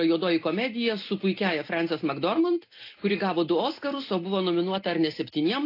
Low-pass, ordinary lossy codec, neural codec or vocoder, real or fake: 5.4 kHz; AAC, 32 kbps; none; real